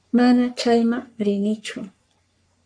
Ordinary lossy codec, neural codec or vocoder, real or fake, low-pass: MP3, 64 kbps; codec, 44.1 kHz, 3.4 kbps, Pupu-Codec; fake; 9.9 kHz